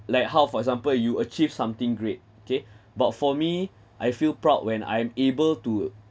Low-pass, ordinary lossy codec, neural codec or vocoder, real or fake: none; none; none; real